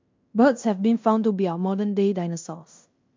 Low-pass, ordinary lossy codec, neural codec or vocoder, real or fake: 7.2 kHz; MP3, 64 kbps; codec, 16 kHz in and 24 kHz out, 0.9 kbps, LongCat-Audio-Codec, fine tuned four codebook decoder; fake